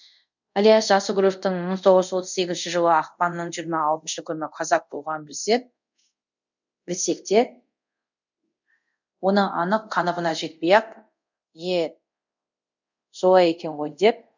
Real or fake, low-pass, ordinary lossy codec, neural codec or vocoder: fake; 7.2 kHz; none; codec, 24 kHz, 0.5 kbps, DualCodec